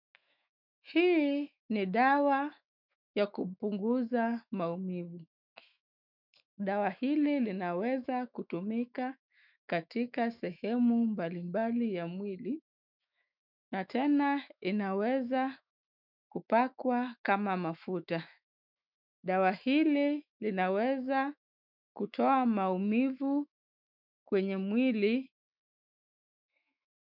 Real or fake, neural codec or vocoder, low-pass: fake; autoencoder, 48 kHz, 128 numbers a frame, DAC-VAE, trained on Japanese speech; 5.4 kHz